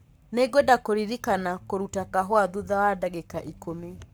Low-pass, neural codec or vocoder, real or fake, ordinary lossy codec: none; codec, 44.1 kHz, 7.8 kbps, Pupu-Codec; fake; none